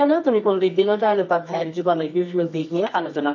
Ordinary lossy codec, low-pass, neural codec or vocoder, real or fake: none; 7.2 kHz; codec, 24 kHz, 0.9 kbps, WavTokenizer, medium music audio release; fake